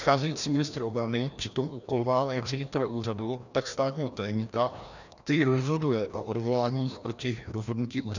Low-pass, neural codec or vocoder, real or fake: 7.2 kHz; codec, 16 kHz, 1 kbps, FreqCodec, larger model; fake